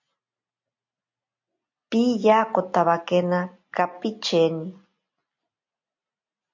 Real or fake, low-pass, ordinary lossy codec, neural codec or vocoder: real; 7.2 kHz; MP3, 48 kbps; none